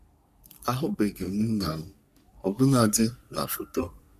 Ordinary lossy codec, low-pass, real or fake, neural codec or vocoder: none; 14.4 kHz; fake; codec, 44.1 kHz, 3.4 kbps, Pupu-Codec